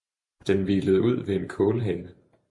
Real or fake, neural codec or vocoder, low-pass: fake; vocoder, 44.1 kHz, 128 mel bands every 512 samples, BigVGAN v2; 10.8 kHz